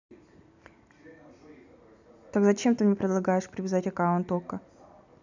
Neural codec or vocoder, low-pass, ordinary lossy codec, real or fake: none; 7.2 kHz; none; real